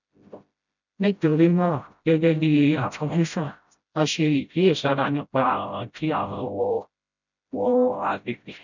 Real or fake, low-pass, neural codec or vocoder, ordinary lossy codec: fake; 7.2 kHz; codec, 16 kHz, 0.5 kbps, FreqCodec, smaller model; none